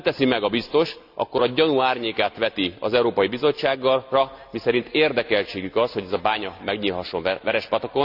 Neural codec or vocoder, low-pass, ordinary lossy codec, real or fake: none; 5.4 kHz; none; real